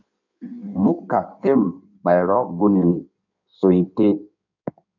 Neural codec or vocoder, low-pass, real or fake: codec, 16 kHz in and 24 kHz out, 1.1 kbps, FireRedTTS-2 codec; 7.2 kHz; fake